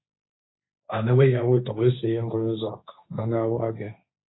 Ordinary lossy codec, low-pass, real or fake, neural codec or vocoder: AAC, 16 kbps; 7.2 kHz; fake; codec, 16 kHz, 1.1 kbps, Voila-Tokenizer